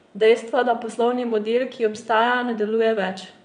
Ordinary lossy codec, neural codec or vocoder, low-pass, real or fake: none; vocoder, 22.05 kHz, 80 mel bands, WaveNeXt; 9.9 kHz; fake